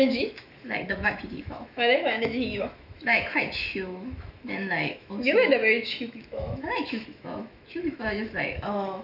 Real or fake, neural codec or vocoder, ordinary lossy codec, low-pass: fake; autoencoder, 48 kHz, 128 numbers a frame, DAC-VAE, trained on Japanese speech; none; 5.4 kHz